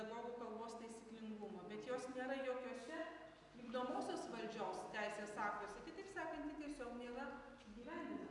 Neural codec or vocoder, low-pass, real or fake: none; 10.8 kHz; real